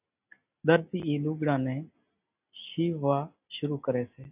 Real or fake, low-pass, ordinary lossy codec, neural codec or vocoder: real; 3.6 kHz; AAC, 32 kbps; none